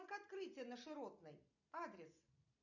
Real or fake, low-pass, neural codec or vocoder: real; 7.2 kHz; none